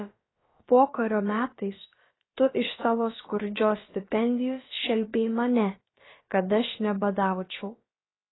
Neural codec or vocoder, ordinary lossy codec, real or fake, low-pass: codec, 16 kHz, about 1 kbps, DyCAST, with the encoder's durations; AAC, 16 kbps; fake; 7.2 kHz